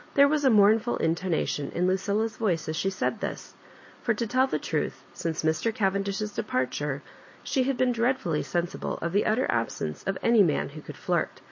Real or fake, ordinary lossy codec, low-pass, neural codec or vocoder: real; MP3, 32 kbps; 7.2 kHz; none